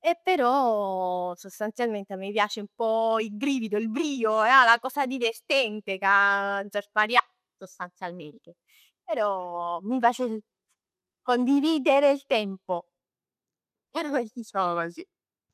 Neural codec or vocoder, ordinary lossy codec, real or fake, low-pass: none; none; real; 14.4 kHz